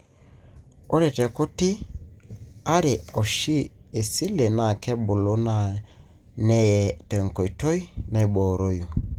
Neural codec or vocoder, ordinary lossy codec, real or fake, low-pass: none; Opus, 24 kbps; real; 19.8 kHz